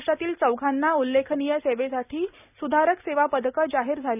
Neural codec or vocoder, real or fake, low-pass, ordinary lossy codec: none; real; 3.6 kHz; none